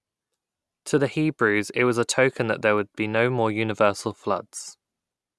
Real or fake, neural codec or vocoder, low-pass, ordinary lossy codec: real; none; none; none